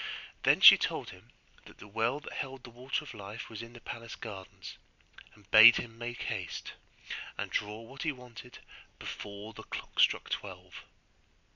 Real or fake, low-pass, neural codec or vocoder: real; 7.2 kHz; none